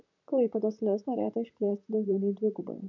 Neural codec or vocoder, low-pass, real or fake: vocoder, 44.1 kHz, 128 mel bands, Pupu-Vocoder; 7.2 kHz; fake